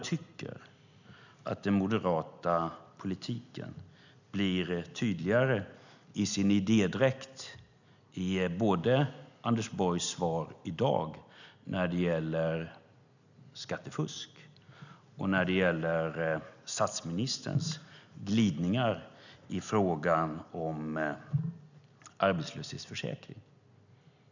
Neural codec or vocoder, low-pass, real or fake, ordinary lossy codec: none; 7.2 kHz; real; none